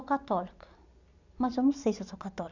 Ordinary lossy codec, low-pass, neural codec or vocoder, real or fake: none; 7.2 kHz; none; real